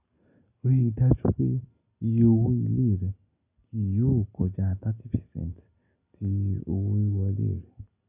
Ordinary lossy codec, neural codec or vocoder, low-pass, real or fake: none; none; 3.6 kHz; real